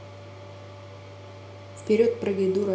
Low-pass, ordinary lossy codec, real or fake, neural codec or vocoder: none; none; real; none